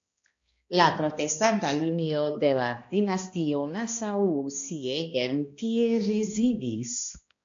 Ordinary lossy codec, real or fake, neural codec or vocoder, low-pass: MP3, 48 kbps; fake; codec, 16 kHz, 1 kbps, X-Codec, HuBERT features, trained on balanced general audio; 7.2 kHz